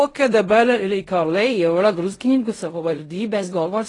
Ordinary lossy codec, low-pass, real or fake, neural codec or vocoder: AAC, 32 kbps; 10.8 kHz; fake; codec, 16 kHz in and 24 kHz out, 0.4 kbps, LongCat-Audio-Codec, fine tuned four codebook decoder